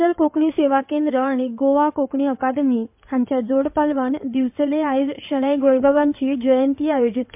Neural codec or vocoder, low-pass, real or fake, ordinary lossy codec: codec, 16 kHz in and 24 kHz out, 2.2 kbps, FireRedTTS-2 codec; 3.6 kHz; fake; MP3, 32 kbps